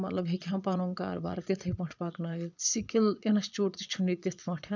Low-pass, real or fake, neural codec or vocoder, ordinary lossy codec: 7.2 kHz; fake; vocoder, 22.05 kHz, 80 mel bands, Vocos; none